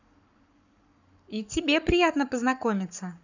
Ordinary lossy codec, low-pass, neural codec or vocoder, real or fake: none; 7.2 kHz; codec, 44.1 kHz, 7.8 kbps, Pupu-Codec; fake